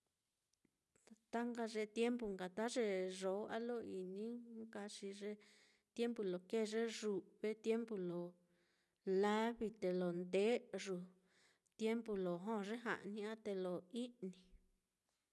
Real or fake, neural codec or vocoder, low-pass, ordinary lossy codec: real; none; none; none